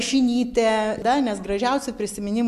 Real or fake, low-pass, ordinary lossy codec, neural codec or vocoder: real; 14.4 kHz; MP3, 64 kbps; none